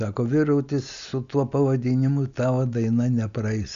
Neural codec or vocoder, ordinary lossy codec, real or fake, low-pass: none; Opus, 64 kbps; real; 7.2 kHz